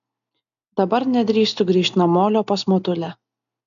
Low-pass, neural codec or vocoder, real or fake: 7.2 kHz; none; real